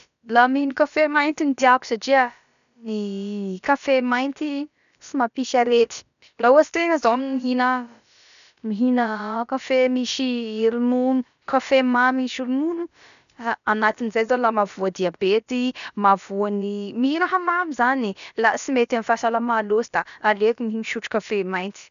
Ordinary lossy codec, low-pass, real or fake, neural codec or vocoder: none; 7.2 kHz; fake; codec, 16 kHz, about 1 kbps, DyCAST, with the encoder's durations